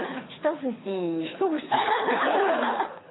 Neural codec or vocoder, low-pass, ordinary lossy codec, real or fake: codec, 24 kHz, 6 kbps, HILCodec; 7.2 kHz; AAC, 16 kbps; fake